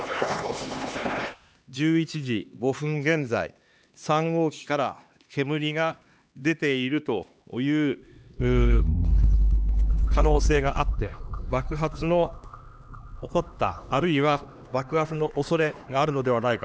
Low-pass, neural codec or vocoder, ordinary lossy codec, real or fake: none; codec, 16 kHz, 2 kbps, X-Codec, HuBERT features, trained on LibriSpeech; none; fake